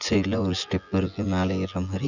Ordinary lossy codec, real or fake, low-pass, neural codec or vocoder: none; fake; 7.2 kHz; vocoder, 24 kHz, 100 mel bands, Vocos